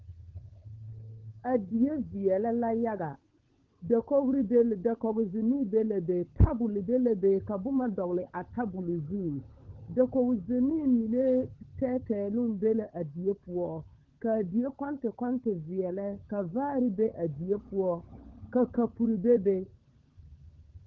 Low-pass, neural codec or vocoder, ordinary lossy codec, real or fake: 7.2 kHz; codec, 16 kHz, 16 kbps, FunCodec, trained on LibriTTS, 50 frames a second; Opus, 16 kbps; fake